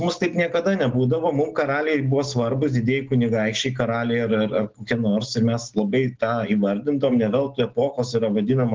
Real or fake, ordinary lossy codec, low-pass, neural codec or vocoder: real; Opus, 16 kbps; 7.2 kHz; none